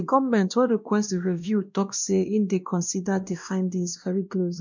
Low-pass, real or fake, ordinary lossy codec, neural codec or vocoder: 7.2 kHz; fake; MP3, 64 kbps; codec, 16 kHz, 1 kbps, X-Codec, WavLM features, trained on Multilingual LibriSpeech